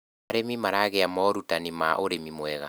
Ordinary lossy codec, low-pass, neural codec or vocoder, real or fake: none; none; none; real